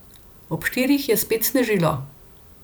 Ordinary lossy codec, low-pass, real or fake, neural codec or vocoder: none; none; fake; vocoder, 44.1 kHz, 128 mel bands every 256 samples, BigVGAN v2